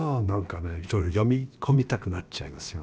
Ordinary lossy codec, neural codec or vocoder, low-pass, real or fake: none; codec, 16 kHz, about 1 kbps, DyCAST, with the encoder's durations; none; fake